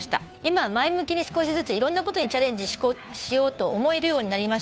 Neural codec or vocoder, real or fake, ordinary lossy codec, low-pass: codec, 16 kHz, 2 kbps, FunCodec, trained on Chinese and English, 25 frames a second; fake; none; none